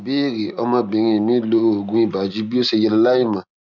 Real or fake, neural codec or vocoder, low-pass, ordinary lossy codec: real; none; 7.2 kHz; none